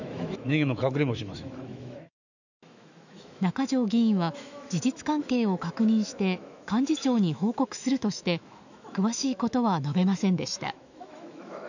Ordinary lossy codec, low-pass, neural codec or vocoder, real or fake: none; 7.2 kHz; autoencoder, 48 kHz, 128 numbers a frame, DAC-VAE, trained on Japanese speech; fake